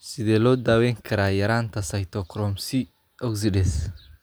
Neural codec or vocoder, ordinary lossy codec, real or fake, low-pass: none; none; real; none